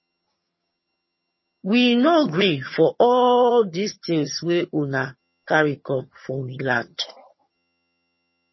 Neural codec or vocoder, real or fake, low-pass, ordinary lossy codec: vocoder, 22.05 kHz, 80 mel bands, HiFi-GAN; fake; 7.2 kHz; MP3, 24 kbps